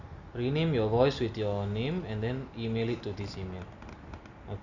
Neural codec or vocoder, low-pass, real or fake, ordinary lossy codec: none; 7.2 kHz; real; none